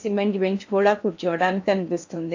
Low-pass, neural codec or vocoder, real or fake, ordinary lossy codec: 7.2 kHz; codec, 16 kHz in and 24 kHz out, 0.8 kbps, FocalCodec, streaming, 65536 codes; fake; AAC, 48 kbps